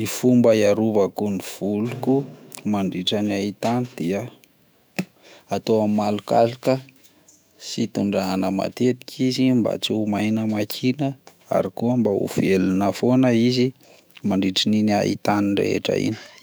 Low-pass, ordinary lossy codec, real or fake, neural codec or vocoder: none; none; fake; autoencoder, 48 kHz, 128 numbers a frame, DAC-VAE, trained on Japanese speech